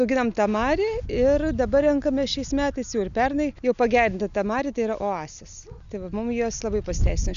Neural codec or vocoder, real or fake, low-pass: none; real; 7.2 kHz